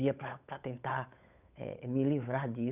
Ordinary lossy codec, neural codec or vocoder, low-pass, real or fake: none; codec, 16 kHz, 8 kbps, FunCodec, trained on Chinese and English, 25 frames a second; 3.6 kHz; fake